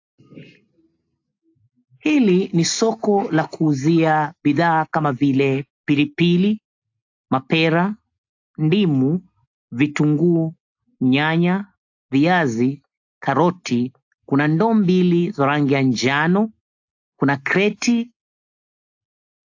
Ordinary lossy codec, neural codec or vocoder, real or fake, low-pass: AAC, 48 kbps; none; real; 7.2 kHz